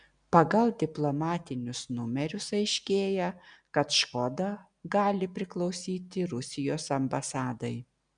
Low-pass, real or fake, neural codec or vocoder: 9.9 kHz; real; none